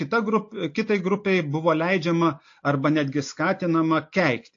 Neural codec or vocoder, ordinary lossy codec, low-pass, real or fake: none; AAC, 48 kbps; 7.2 kHz; real